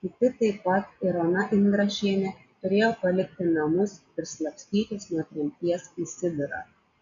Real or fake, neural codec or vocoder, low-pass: real; none; 7.2 kHz